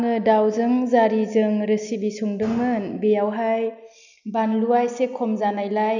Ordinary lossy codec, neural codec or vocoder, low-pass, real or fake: none; none; 7.2 kHz; real